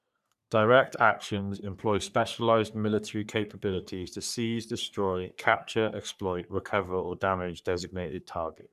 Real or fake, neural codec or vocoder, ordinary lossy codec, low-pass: fake; codec, 44.1 kHz, 3.4 kbps, Pupu-Codec; none; 14.4 kHz